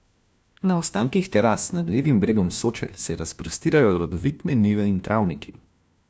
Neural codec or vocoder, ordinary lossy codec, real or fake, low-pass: codec, 16 kHz, 1 kbps, FunCodec, trained on LibriTTS, 50 frames a second; none; fake; none